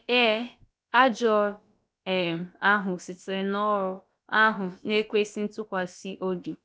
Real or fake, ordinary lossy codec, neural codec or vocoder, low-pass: fake; none; codec, 16 kHz, about 1 kbps, DyCAST, with the encoder's durations; none